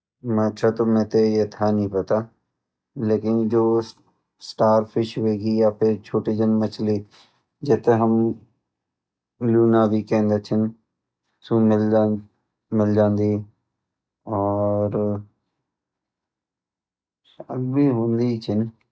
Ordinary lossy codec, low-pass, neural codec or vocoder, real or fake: none; none; none; real